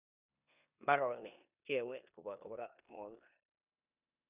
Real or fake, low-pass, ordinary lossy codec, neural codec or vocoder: fake; 3.6 kHz; none; codec, 16 kHz in and 24 kHz out, 0.9 kbps, LongCat-Audio-Codec, four codebook decoder